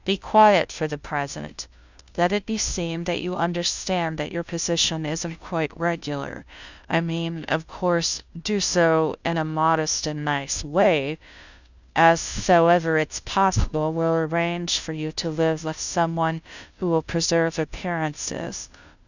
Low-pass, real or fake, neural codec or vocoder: 7.2 kHz; fake; codec, 16 kHz, 0.5 kbps, FunCodec, trained on Chinese and English, 25 frames a second